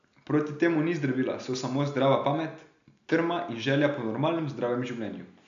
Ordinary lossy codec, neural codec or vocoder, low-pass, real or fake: AAC, 64 kbps; none; 7.2 kHz; real